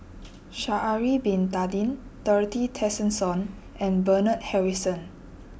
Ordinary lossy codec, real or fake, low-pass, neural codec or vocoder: none; real; none; none